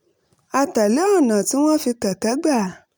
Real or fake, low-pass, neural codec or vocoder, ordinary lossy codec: real; none; none; none